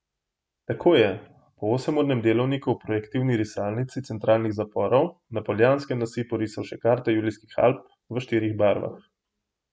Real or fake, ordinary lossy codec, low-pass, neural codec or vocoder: real; none; none; none